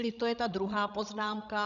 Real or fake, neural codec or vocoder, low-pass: fake; codec, 16 kHz, 16 kbps, FreqCodec, larger model; 7.2 kHz